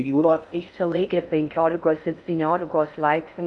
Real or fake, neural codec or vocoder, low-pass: fake; codec, 16 kHz in and 24 kHz out, 0.6 kbps, FocalCodec, streaming, 4096 codes; 10.8 kHz